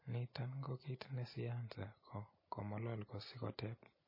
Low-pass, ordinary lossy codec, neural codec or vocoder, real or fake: 5.4 kHz; MP3, 24 kbps; none; real